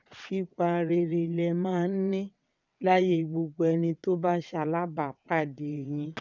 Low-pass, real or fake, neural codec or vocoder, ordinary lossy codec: 7.2 kHz; fake; vocoder, 22.05 kHz, 80 mel bands, WaveNeXt; none